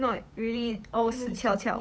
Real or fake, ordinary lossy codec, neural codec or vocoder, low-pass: fake; none; codec, 16 kHz, 8 kbps, FunCodec, trained on Chinese and English, 25 frames a second; none